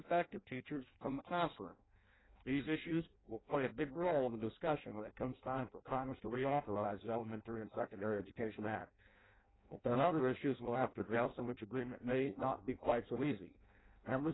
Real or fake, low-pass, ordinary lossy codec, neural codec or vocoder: fake; 7.2 kHz; AAC, 16 kbps; codec, 16 kHz in and 24 kHz out, 0.6 kbps, FireRedTTS-2 codec